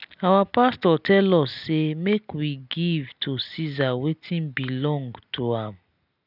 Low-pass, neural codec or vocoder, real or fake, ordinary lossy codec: 5.4 kHz; none; real; none